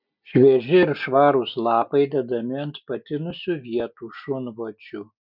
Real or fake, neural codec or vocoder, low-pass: real; none; 5.4 kHz